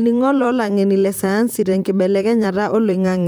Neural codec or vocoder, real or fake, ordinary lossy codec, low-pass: vocoder, 44.1 kHz, 128 mel bands, Pupu-Vocoder; fake; none; none